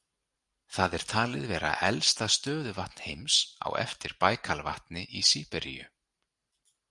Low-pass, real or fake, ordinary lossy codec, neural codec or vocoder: 10.8 kHz; real; Opus, 32 kbps; none